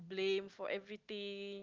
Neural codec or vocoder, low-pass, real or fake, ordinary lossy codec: none; 7.2 kHz; real; Opus, 24 kbps